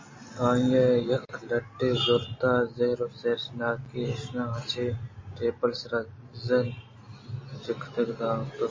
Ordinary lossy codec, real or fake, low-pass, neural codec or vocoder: MP3, 32 kbps; real; 7.2 kHz; none